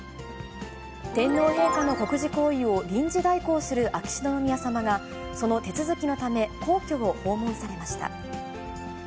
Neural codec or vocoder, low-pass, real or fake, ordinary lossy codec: none; none; real; none